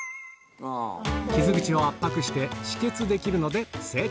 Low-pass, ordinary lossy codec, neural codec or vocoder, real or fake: none; none; none; real